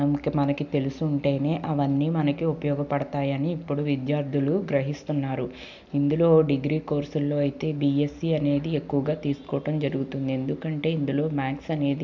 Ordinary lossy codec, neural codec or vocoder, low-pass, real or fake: none; none; 7.2 kHz; real